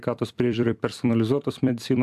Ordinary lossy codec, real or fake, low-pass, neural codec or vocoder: AAC, 96 kbps; fake; 14.4 kHz; vocoder, 44.1 kHz, 128 mel bands every 256 samples, BigVGAN v2